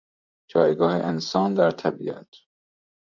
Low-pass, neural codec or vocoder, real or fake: 7.2 kHz; vocoder, 44.1 kHz, 128 mel bands, Pupu-Vocoder; fake